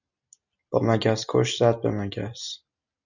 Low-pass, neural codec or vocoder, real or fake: 7.2 kHz; none; real